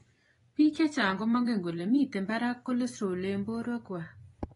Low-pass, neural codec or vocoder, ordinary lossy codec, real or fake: 10.8 kHz; vocoder, 24 kHz, 100 mel bands, Vocos; AAC, 32 kbps; fake